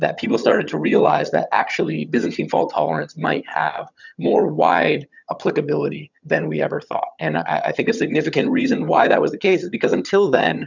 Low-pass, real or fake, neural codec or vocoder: 7.2 kHz; fake; vocoder, 22.05 kHz, 80 mel bands, HiFi-GAN